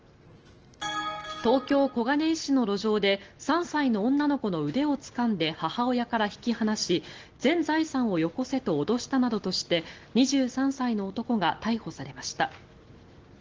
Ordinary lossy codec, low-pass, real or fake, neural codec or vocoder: Opus, 16 kbps; 7.2 kHz; real; none